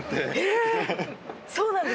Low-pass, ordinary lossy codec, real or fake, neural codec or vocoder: none; none; real; none